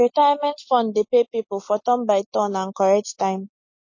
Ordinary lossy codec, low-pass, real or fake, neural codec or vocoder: MP3, 32 kbps; 7.2 kHz; real; none